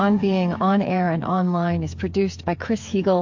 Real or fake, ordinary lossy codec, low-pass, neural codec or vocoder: fake; MP3, 48 kbps; 7.2 kHz; codec, 16 kHz, 8 kbps, FreqCodec, smaller model